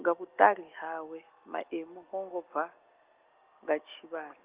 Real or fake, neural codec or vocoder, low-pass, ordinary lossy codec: real; none; 3.6 kHz; Opus, 32 kbps